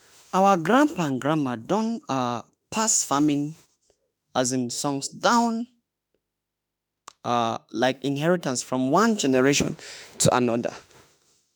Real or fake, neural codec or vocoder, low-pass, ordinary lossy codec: fake; autoencoder, 48 kHz, 32 numbers a frame, DAC-VAE, trained on Japanese speech; none; none